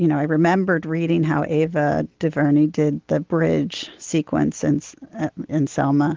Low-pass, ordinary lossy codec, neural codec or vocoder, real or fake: 7.2 kHz; Opus, 16 kbps; none; real